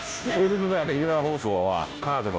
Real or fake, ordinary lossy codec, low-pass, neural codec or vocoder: fake; none; none; codec, 16 kHz, 0.5 kbps, FunCodec, trained on Chinese and English, 25 frames a second